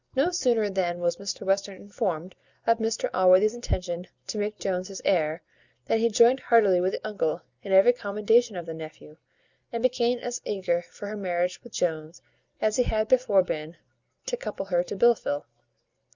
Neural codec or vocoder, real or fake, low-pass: none; real; 7.2 kHz